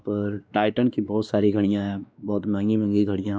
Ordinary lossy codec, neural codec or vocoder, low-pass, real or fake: none; codec, 16 kHz, 2 kbps, X-Codec, WavLM features, trained on Multilingual LibriSpeech; none; fake